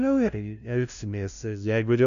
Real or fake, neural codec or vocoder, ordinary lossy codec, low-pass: fake; codec, 16 kHz, 0.5 kbps, FunCodec, trained on LibriTTS, 25 frames a second; AAC, 96 kbps; 7.2 kHz